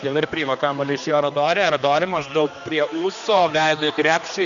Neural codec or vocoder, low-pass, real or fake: codec, 16 kHz, 2 kbps, X-Codec, HuBERT features, trained on general audio; 7.2 kHz; fake